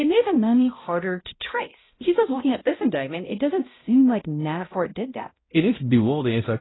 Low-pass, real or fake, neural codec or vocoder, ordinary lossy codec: 7.2 kHz; fake; codec, 16 kHz, 0.5 kbps, X-Codec, HuBERT features, trained on balanced general audio; AAC, 16 kbps